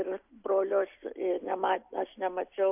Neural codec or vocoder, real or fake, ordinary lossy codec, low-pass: none; real; AAC, 32 kbps; 3.6 kHz